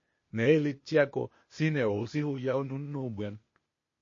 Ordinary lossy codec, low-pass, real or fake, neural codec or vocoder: MP3, 32 kbps; 7.2 kHz; fake; codec, 16 kHz, 0.8 kbps, ZipCodec